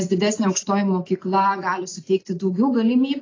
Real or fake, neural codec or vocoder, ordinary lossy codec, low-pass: real; none; AAC, 32 kbps; 7.2 kHz